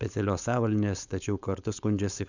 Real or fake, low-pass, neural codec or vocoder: fake; 7.2 kHz; codec, 16 kHz, 4.8 kbps, FACodec